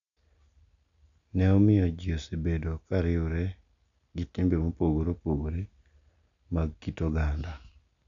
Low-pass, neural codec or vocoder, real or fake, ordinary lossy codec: 7.2 kHz; none; real; none